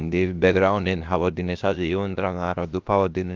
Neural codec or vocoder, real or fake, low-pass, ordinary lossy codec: codec, 16 kHz, about 1 kbps, DyCAST, with the encoder's durations; fake; 7.2 kHz; Opus, 32 kbps